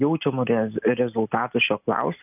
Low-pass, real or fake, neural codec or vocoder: 3.6 kHz; real; none